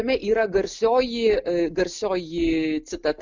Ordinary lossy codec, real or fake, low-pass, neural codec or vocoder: MP3, 64 kbps; real; 7.2 kHz; none